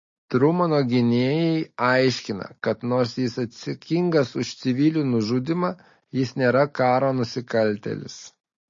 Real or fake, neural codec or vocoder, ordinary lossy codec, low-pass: real; none; MP3, 32 kbps; 7.2 kHz